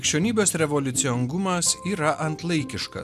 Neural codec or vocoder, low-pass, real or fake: none; 14.4 kHz; real